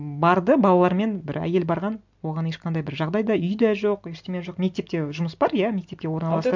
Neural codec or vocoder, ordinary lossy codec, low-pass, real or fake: none; MP3, 64 kbps; 7.2 kHz; real